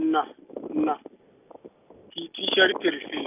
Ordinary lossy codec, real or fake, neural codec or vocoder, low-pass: none; real; none; 3.6 kHz